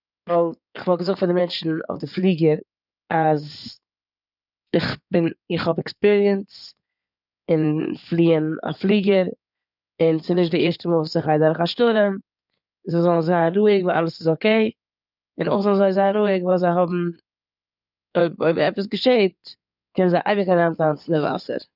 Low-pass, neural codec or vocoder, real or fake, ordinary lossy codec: 5.4 kHz; codec, 16 kHz in and 24 kHz out, 2.2 kbps, FireRedTTS-2 codec; fake; none